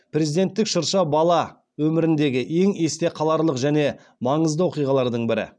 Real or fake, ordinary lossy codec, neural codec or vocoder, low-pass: real; none; none; 9.9 kHz